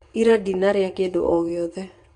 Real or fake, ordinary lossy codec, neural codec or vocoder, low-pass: fake; none; vocoder, 22.05 kHz, 80 mel bands, WaveNeXt; 9.9 kHz